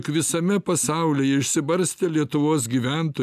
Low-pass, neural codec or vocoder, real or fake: 14.4 kHz; none; real